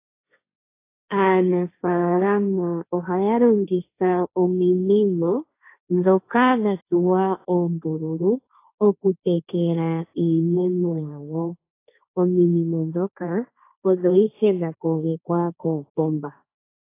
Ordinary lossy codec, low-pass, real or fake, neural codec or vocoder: AAC, 24 kbps; 3.6 kHz; fake; codec, 16 kHz, 1.1 kbps, Voila-Tokenizer